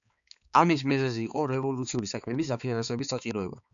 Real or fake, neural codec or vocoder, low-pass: fake; codec, 16 kHz, 4 kbps, X-Codec, HuBERT features, trained on balanced general audio; 7.2 kHz